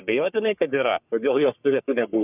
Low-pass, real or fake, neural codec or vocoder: 3.6 kHz; fake; codec, 44.1 kHz, 3.4 kbps, Pupu-Codec